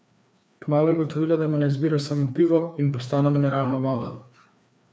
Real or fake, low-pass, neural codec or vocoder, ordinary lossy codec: fake; none; codec, 16 kHz, 2 kbps, FreqCodec, larger model; none